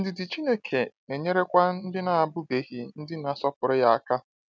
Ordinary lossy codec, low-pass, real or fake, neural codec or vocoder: none; none; real; none